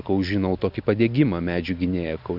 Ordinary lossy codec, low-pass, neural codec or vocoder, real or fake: AAC, 48 kbps; 5.4 kHz; none; real